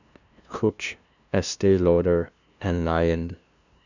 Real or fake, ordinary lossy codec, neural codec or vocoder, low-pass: fake; none; codec, 16 kHz, 0.5 kbps, FunCodec, trained on LibriTTS, 25 frames a second; 7.2 kHz